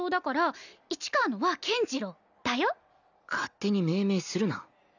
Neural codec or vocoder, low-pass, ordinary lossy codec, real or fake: none; 7.2 kHz; none; real